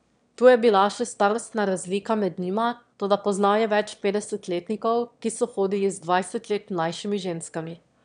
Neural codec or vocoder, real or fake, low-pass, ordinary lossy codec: autoencoder, 22.05 kHz, a latent of 192 numbers a frame, VITS, trained on one speaker; fake; 9.9 kHz; none